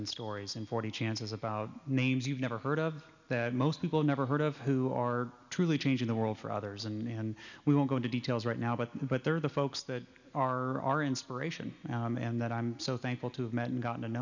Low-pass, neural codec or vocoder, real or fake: 7.2 kHz; none; real